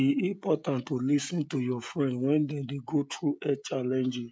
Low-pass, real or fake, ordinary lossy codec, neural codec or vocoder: none; fake; none; codec, 16 kHz, 16 kbps, FreqCodec, smaller model